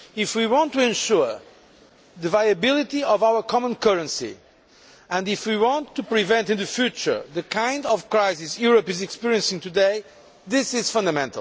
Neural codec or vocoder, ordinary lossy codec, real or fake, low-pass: none; none; real; none